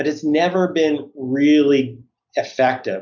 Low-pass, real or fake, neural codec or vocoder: 7.2 kHz; real; none